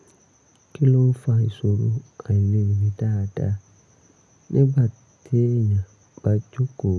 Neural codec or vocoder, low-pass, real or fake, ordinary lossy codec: none; none; real; none